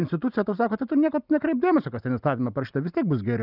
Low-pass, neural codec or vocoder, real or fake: 5.4 kHz; none; real